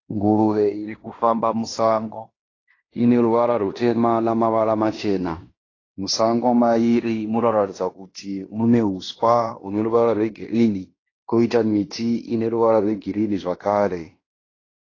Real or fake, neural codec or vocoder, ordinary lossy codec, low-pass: fake; codec, 16 kHz in and 24 kHz out, 0.9 kbps, LongCat-Audio-Codec, fine tuned four codebook decoder; AAC, 32 kbps; 7.2 kHz